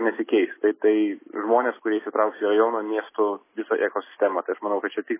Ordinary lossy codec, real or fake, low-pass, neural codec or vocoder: MP3, 16 kbps; real; 3.6 kHz; none